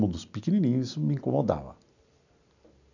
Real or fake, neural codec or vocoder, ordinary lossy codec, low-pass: real; none; none; 7.2 kHz